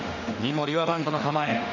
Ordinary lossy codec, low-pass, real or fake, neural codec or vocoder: none; 7.2 kHz; fake; autoencoder, 48 kHz, 32 numbers a frame, DAC-VAE, trained on Japanese speech